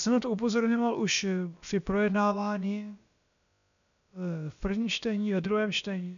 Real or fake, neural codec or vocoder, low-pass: fake; codec, 16 kHz, about 1 kbps, DyCAST, with the encoder's durations; 7.2 kHz